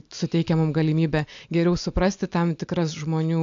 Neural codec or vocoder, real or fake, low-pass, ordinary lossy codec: none; real; 7.2 kHz; AAC, 64 kbps